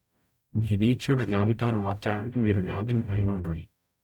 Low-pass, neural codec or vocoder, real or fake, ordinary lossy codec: 19.8 kHz; codec, 44.1 kHz, 0.9 kbps, DAC; fake; none